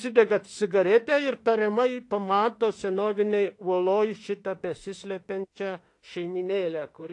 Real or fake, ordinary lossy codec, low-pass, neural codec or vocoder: fake; AAC, 48 kbps; 10.8 kHz; autoencoder, 48 kHz, 32 numbers a frame, DAC-VAE, trained on Japanese speech